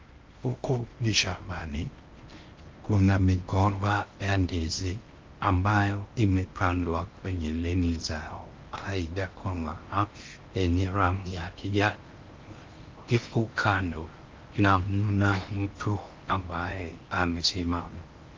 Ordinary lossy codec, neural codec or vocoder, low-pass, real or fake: Opus, 32 kbps; codec, 16 kHz in and 24 kHz out, 0.6 kbps, FocalCodec, streaming, 2048 codes; 7.2 kHz; fake